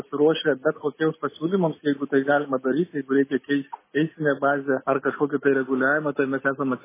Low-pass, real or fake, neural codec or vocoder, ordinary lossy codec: 3.6 kHz; real; none; MP3, 16 kbps